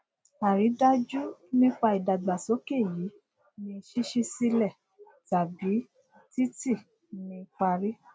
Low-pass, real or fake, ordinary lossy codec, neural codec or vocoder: none; real; none; none